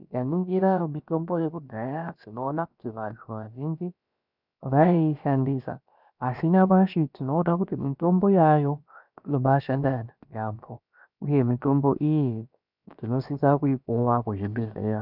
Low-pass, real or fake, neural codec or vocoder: 5.4 kHz; fake; codec, 16 kHz, about 1 kbps, DyCAST, with the encoder's durations